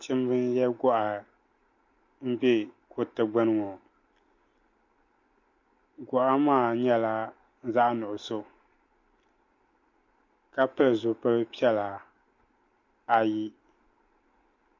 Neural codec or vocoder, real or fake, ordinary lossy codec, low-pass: none; real; MP3, 48 kbps; 7.2 kHz